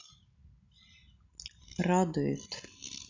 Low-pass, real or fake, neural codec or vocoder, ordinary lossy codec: 7.2 kHz; real; none; AAC, 48 kbps